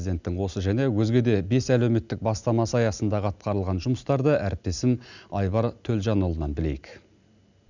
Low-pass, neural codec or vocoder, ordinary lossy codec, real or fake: 7.2 kHz; none; none; real